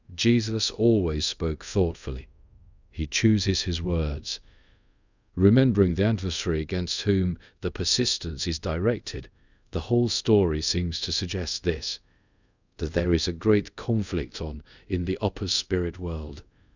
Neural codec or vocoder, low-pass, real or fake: codec, 24 kHz, 0.5 kbps, DualCodec; 7.2 kHz; fake